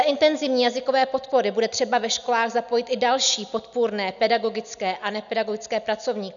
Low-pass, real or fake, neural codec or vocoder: 7.2 kHz; real; none